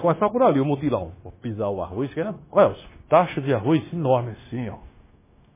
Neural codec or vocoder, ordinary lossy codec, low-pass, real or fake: codec, 16 kHz, 0.9 kbps, LongCat-Audio-Codec; MP3, 16 kbps; 3.6 kHz; fake